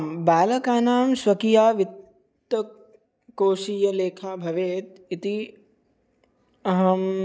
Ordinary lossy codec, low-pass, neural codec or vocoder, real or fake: none; none; none; real